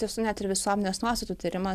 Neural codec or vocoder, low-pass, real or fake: none; 14.4 kHz; real